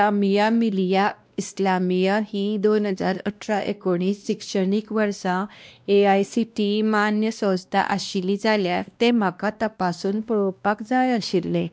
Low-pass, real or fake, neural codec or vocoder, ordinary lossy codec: none; fake; codec, 16 kHz, 1 kbps, X-Codec, WavLM features, trained on Multilingual LibriSpeech; none